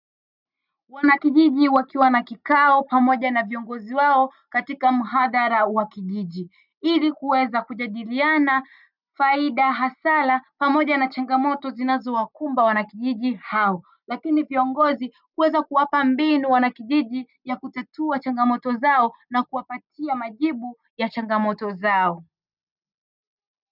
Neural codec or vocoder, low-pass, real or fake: none; 5.4 kHz; real